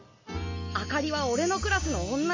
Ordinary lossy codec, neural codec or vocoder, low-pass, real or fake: MP3, 32 kbps; none; 7.2 kHz; real